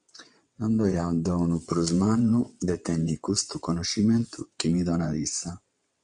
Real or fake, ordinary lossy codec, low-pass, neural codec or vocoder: fake; MP3, 64 kbps; 9.9 kHz; vocoder, 22.05 kHz, 80 mel bands, WaveNeXt